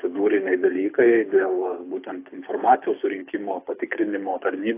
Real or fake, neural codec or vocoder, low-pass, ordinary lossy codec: fake; codec, 24 kHz, 6 kbps, HILCodec; 3.6 kHz; AAC, 24 kbps